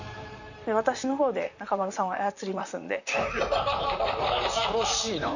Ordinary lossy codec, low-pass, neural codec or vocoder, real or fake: none; 7.2 kHz; vocoder, 44.1 kHz, 128 mel bands, Pupu-Vocoder; fake